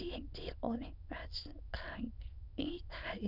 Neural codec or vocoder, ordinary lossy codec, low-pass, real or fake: autoencoder, 22.05 kHz, a latent of 192 numbers a frame, VITS, trained on many speakers; none; 5.4 kHz; fake